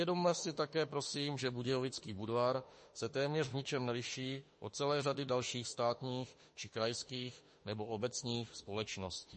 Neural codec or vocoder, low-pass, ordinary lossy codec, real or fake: autoencoder, 48 kHz, 32 numbers a frame, DAC-VAE, trained on Japanese speech; 10.8 kHz; MP3, 32 kbps; fake